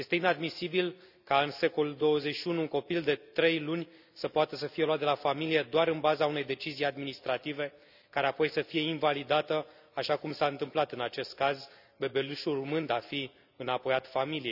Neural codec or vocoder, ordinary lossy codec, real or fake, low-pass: none; none; real; 5.4 kHz